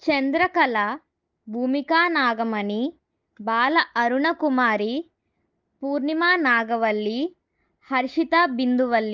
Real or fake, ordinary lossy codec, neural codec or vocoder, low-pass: real; Opus, 32 kbps; none; 7.2 kHz